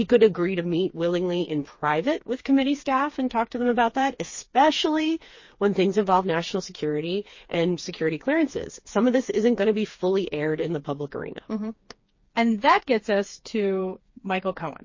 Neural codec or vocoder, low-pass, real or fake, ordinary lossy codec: codec, 16 kHz, 4 kbps, FreqCodec, smaller model; 7.2 kHz; fake; MP3, 32 kbps